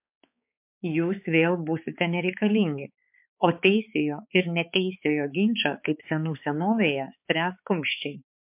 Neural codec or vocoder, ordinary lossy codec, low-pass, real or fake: codec, 16 kHz, 4 kbps, X-Codec, HuBERT features, trained on balanced general audio; MP3, 32 kbps; 3.6 kHz; fake